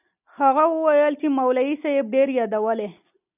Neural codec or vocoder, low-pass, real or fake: none; 3.6 kHz; real